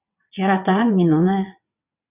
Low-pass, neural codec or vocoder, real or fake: 3.6 kHz; vocoder, 22.05 kHz, 80 mel bands, WaveNeXt; fake